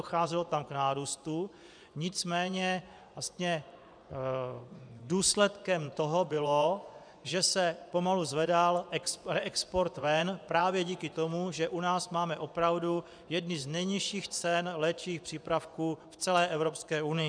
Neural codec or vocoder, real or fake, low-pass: vocoder, 24 kHz, 100 mel bands, Vocos; fake; 9.9 kHz